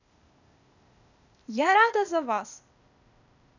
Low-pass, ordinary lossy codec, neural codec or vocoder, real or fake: 7.2 kHz; none; codec, 16 kHz, 0.8 kbps, ZipCodec; fake